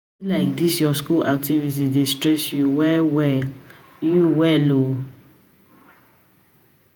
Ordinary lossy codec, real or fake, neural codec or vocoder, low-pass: none; fake; vocoder, 48 kHz, 128 mel bands, Vocos; none